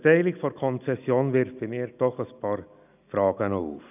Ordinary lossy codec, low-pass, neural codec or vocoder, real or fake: none; 3.6 kHz; none; real